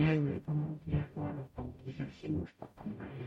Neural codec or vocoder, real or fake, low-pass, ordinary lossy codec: codec, 44.1 kHz, 0.9 kbps, DAC; fake; 19.8 kHz; MP3, 64 kbps